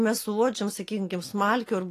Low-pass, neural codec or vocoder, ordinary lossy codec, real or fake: 14.4 kHz; none; AAC, 48 kbps; real